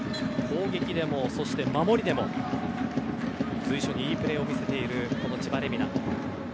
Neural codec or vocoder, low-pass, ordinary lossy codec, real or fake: none; none; none; real